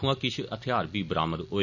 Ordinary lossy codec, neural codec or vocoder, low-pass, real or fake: none; none; 7.2 kHz; real